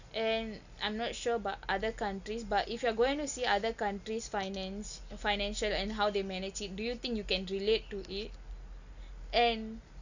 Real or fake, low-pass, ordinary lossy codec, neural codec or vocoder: real; 7.2 kHz; none; none